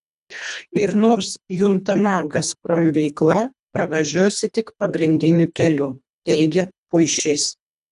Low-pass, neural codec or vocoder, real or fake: 10.8 kHz; codec, 24 kHz, 1.5 kbps, HILCodec; fake